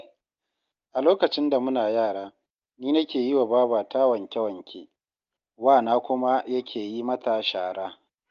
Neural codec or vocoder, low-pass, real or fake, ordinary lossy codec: none; 7.2 kHz; real; Opus, 32 kbps